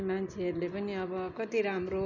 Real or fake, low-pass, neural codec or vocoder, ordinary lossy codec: real; 7.2 kHz; none; none